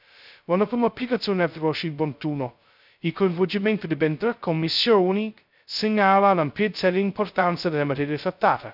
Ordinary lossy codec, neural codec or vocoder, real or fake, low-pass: none; codec, 16 kHz, 0.2 kbps, FocalCodec; fake; 5.4 kHz